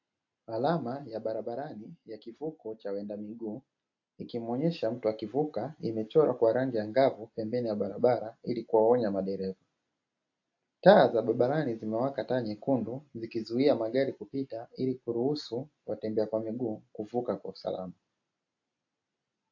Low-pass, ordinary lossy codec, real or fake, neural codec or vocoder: 7.2 kHz; AAC, 48 kbps; real; none